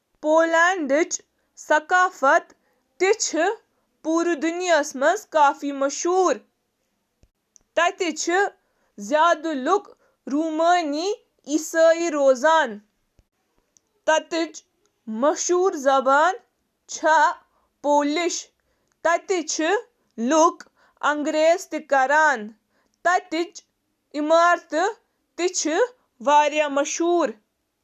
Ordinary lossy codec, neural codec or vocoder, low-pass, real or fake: none; none; 14.4 kHz; real